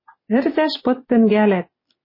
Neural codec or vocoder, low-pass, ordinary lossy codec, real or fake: none; 5.4 kHz; MP3, 24 kbps; real